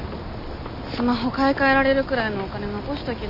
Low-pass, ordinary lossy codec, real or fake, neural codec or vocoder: 5.4 kHz; none; real; none